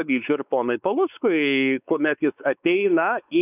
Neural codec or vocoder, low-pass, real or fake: codec, 16 kHz, 4 kbps, X-Codec, WavLM features, trained on Multilingual LibriSpeech; 3.6 kHz; fake